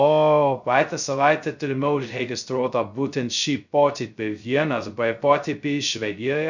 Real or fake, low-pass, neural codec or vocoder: fake; 7.2 kHz; codec, 16 kHz, 0.2 kbps, FocalCodec